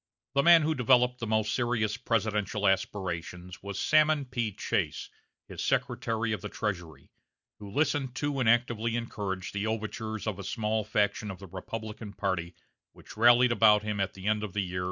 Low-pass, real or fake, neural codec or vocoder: 7.2 kHz; real; none